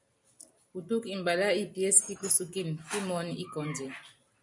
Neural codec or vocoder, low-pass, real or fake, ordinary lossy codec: none; 10.8 kHz; real; MP3, 96 kbps